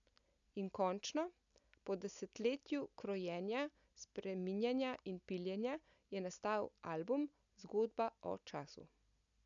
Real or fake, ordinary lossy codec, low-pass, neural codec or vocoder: real; none; 7.2 kHz; none